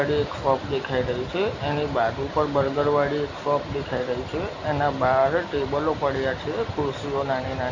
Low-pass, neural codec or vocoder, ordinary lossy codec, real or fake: 7.2 kHz; none; AAC, 32 kbps; real